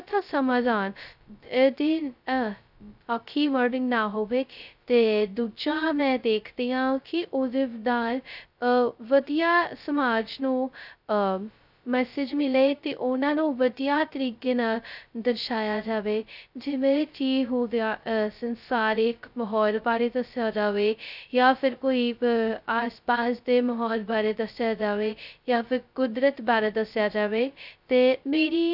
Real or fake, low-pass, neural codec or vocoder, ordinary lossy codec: fake; 5.4 kHz; codec, 16 kHz, 0.2 kbps, FocalCodec; none